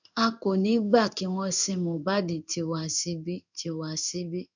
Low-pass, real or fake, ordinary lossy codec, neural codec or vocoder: 7.2 kHz; fake; none; codec, 16 kHz in and 24 kHz out, 1 kbps, XY-Tokenizer